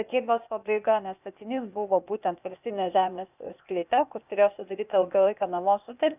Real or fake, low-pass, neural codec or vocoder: fake; 3.6 kHz; codec, 16 kHz, 0.8 kbps, ZipCodec